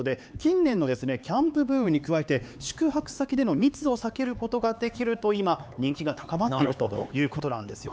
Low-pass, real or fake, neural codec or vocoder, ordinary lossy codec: none; fake; codec, 16 kHz, 4 kbps, X-Codec, HuBERT features, trained on LibriSpeech; none